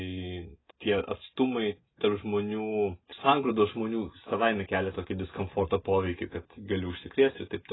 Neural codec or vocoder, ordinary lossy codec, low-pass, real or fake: none; AAC, 16 kbps; 7.2 kHz; real